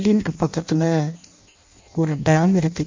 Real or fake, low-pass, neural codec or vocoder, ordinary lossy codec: fake; 7.2 kHz; codec, 16 kHz in and 24 kHz out, 0.6 kbps, FireRedTTS-2 codec; MP3, 64 kbps